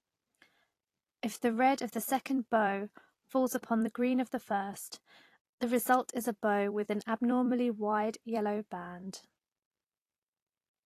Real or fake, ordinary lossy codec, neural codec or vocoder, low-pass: fake; AAC, 48 kbps; vocoder, 44.1 kHz, 128 mel bands every 256 samples, BigVGAN v2; 14.4 kHz